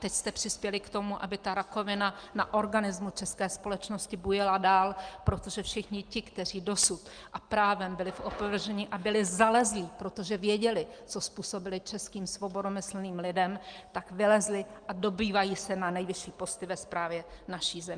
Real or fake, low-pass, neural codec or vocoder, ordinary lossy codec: real; 9.9 kHz; none; Opus, 32 kbps